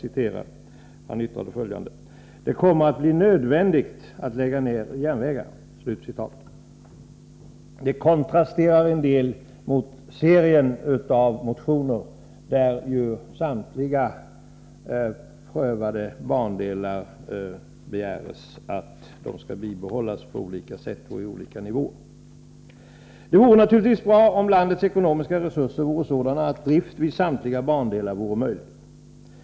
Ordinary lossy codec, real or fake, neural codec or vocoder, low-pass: none; real; none; none